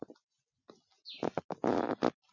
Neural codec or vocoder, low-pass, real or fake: none; 7.2 kHz; real